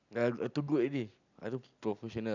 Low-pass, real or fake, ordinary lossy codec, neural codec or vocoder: 7.2 kHz; real; none; none